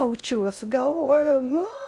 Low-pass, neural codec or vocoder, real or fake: 10.8 kHz; codec, 16 kHz in and 24 kHz out, 0.6 kbps, FocalCodec, streaming, 2048 codes; fake